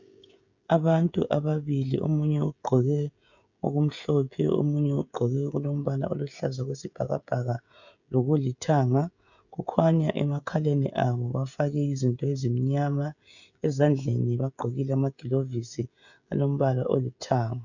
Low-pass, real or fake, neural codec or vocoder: 7.2 kHz; fake; codec, 16 kHz, 16 kbps, FreqCodec, smaller model